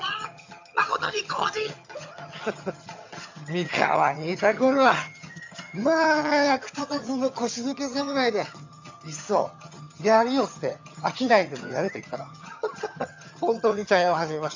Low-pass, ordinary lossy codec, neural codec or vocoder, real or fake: 7.2 kHz; MP3, 64 kbps; vocoder, 22.05 kHz, 80 mel bands, HiFi-GAN; fake